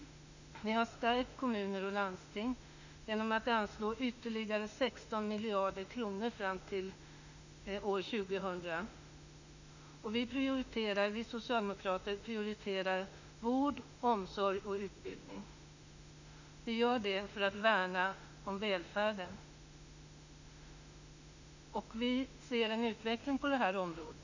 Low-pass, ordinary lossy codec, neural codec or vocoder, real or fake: 7.2 kHz; none; autoencoder, 48 kHz, 32 numbers a frame, DAC-VAE, trained on Japanese speech; fake